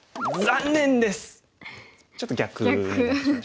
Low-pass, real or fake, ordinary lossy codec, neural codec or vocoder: none; real; none; none